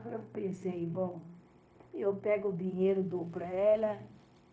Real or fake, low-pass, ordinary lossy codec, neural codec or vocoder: fake; none; none; codec, 16 kHz, 0.9 kbps, LongCat-Audio-Codec